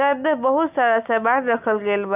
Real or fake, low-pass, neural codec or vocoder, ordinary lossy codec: real; 3.6 kHz; none; none